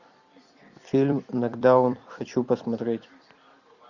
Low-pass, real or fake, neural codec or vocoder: 7.2 kHz; fake; vocoder, 44.1 kHz, 128 mel bands every 256 samples, BigVGAN v2